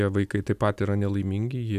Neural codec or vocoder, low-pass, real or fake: none; 14.4 kHz; real